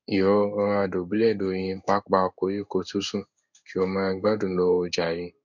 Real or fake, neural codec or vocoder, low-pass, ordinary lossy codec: fake; codec, 16 kHz in and 24 kHz out, 1 kbps, XY-Tokenizer; 7.2 kHz; none